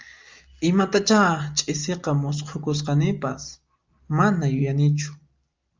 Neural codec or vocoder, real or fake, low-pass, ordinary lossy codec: none; real; 7.2 kHz; Opus, 32 kbps